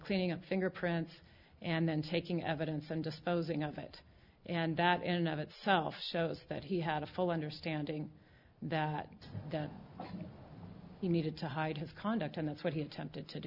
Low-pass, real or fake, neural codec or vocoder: 5.4 kHz; real; none